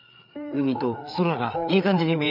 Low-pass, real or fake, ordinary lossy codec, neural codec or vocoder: 5.4 kHz; fake; none; codec, 16 kHz, 4 kbps, FreqCodec, larger model